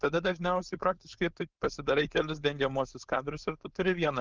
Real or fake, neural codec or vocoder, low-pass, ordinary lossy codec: fake; codec, 16 kHz, 4.8 kbps, FACodec; 7.2 kHz; Opus, 32 kbps